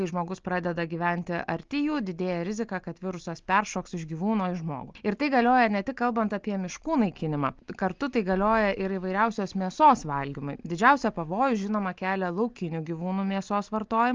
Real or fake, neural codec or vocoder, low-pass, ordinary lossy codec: real; none; 7.2 kHz; Opus, 24 kbps